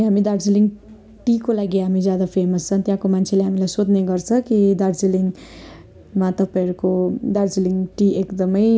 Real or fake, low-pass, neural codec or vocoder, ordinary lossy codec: real; none; none; none